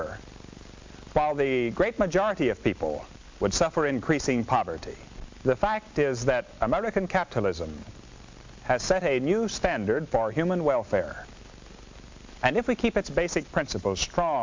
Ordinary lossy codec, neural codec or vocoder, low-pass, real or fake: MP3, 64 kbps; none; 7.2 kHz; real